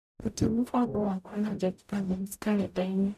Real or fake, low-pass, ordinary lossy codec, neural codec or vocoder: fake; 14.4 kHz; none; codec, 44.1 kHz, 0.9 kbps, DAC